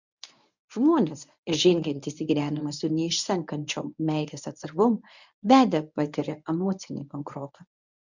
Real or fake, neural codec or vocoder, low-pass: fake; codec, 24 kHz, 0.9 kbps, WavTokenizer, medium speech release version 1; 7.2 kHz